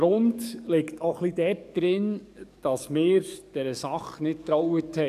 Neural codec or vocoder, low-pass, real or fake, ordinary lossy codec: codec, 44.1 kHz, 7.8 kbps, DAC; 14.4 kHz; fake; none